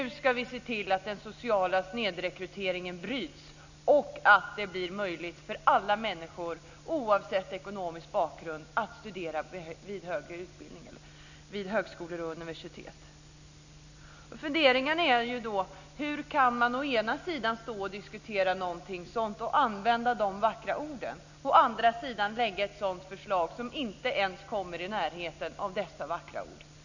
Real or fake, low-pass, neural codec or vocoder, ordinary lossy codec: real; 7.2 kHz; none; none